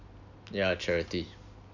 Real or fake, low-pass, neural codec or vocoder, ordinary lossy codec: real; 7.2 kHz; none; none